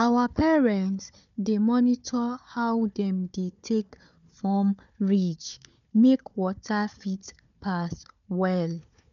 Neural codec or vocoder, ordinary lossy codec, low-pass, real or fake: codec, 16 kHz, 4 kbps, FunCodec, trained on Chinese and English, 50 frames a second; none; 7.2 kHz; fake